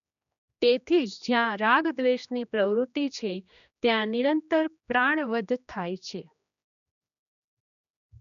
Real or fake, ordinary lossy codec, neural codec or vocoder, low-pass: fake; none; codec, 16 kHz, 2 kbps, X-Codec, HuBERT features, trained on general audio; 7.2 kHz